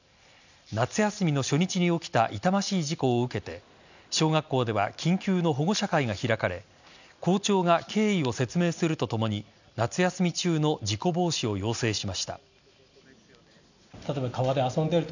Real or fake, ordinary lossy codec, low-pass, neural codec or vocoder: real; none; 7.2 kHz; none